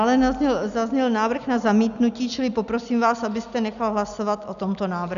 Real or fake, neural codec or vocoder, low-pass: real; none; 7.2 kHz